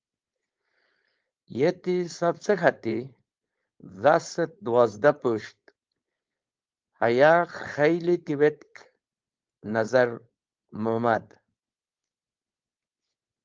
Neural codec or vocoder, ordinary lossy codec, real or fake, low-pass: codec, 16 kHz, 4.8 kbps, FACodec; Opus, 24 kbps; fake; 7.2 kHz